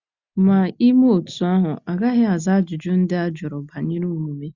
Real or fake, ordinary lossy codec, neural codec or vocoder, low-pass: real; Opus, 64 kbps; none; 7.2 kHz